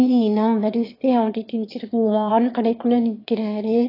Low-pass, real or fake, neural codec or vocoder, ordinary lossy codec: 5.4 kHz; fake; autoencoder, 22.05 kHz, a latent of 192 numbers a frame, VITS, trained on one speaker; AAC, 32 kbps